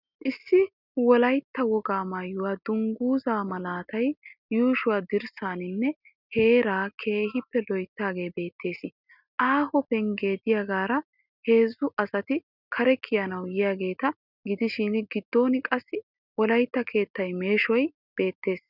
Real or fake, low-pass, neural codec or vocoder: real; 5.4 kHz; none